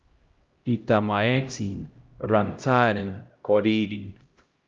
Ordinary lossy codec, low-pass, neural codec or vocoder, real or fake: Opus, 32 kbps; 7.2 kHz; codec, 16 kHz, 0.5 kbps, X-Codec, HuBERT features, trained on LibriSpeech; fake